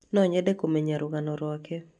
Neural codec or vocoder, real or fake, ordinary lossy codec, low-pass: none; real; none; 10.8 kHz